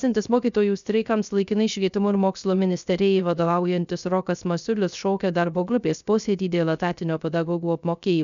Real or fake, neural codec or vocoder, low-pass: fake; codec, 16 kHz, 0.3 kbps, FocalCodec; 7.2 kHz